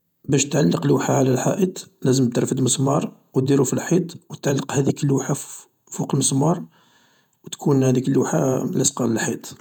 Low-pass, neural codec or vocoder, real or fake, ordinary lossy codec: 19.8 kHz; vocoder, 48 kHz, 128 mel bands, Vocos; fake; none